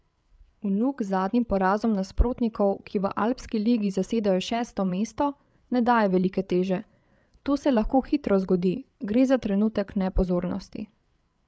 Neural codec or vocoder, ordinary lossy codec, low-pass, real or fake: codec, 16 kHz, 8 kbps, FreqCodec, larger model; none; none; fake